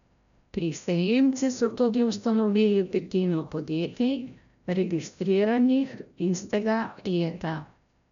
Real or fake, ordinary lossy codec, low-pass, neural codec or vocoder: fake; none; 7.2 kHz; codec, 16 kHz, 0.5 kbps, FreqCodec, larger model